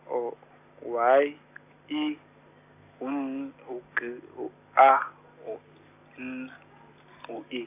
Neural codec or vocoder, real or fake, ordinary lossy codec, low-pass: none; real; AAC, 32 kbps; 3.6 kHz